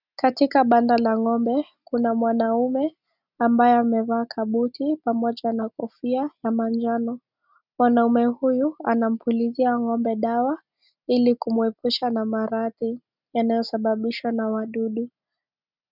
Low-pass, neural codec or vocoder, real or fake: 5.4 kHz; none; real